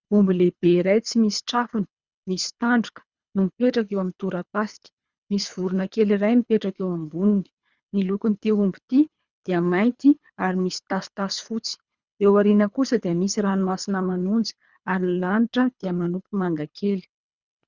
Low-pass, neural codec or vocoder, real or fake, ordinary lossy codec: 7.2 kHz; codec, 24 kHz, 3 kbps, HILCodec; fake; Opus, 64 kbps